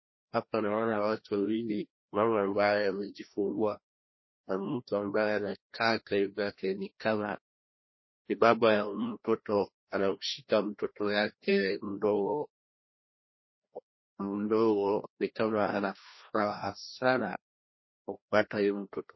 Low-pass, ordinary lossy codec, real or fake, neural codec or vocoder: 7.2 kHz; MP3, 24 kbps; fake; codec, 16 kHz, 1 kbps, FreqCodec, larger model